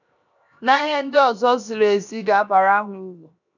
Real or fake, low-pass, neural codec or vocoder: fake; 7.2 kHz; codec, 16 kHz, 0.7 kbps, FocalCodec